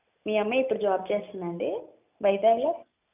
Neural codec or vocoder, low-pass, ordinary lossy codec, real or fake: none; 3.6 kHz; none; real